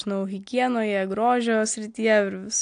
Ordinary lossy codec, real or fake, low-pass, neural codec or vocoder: AAC, 64 kbps; real; 9.9 kHz; none